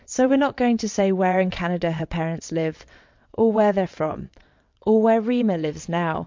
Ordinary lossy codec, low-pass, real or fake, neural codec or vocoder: MP3, 48 kbps; 7.2 kHz; fake; vocoder, 22.05 kHz, 80 mel bands, WaveNeXt